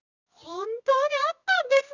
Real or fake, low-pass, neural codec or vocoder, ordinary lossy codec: fake; 7.2 kHz; codec, 24 kHz, 0.9 kbps, WavTokenizer, medium music audio release; none